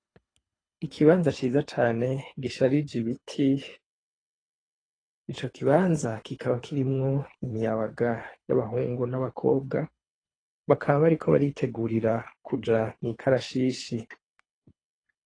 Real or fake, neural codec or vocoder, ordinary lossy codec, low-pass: fake; codec, 24 kHz, 3 kbps, HILCodec; AAC, 32 kbps; 9.9 kHz